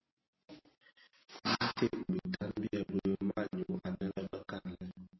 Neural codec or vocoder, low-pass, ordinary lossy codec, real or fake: none; 7.2 kHz; MP3, 24 kbps; real